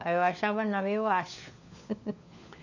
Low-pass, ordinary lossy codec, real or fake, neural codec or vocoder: 7.2 kHz; none; fake; codec, 16 kHz, 4 kbps, FunCodec, trained on LibriTTS, 50 frames a second